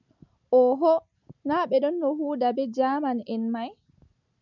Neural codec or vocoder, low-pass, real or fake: none; 7.2 kHz; real